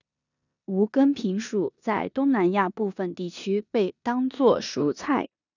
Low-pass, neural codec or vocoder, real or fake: 7.2 kHz; codec, 16 kHz in and 24 kHz out, 0.9 kbps, LongCat-Audio-Codec, four codebook decoder; fake